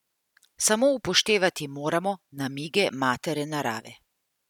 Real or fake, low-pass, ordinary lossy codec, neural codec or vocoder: real; 19.8 kHz; none; none